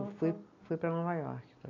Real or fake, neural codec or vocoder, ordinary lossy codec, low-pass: real; none; AAC, 32 kbps; 7.2 kHz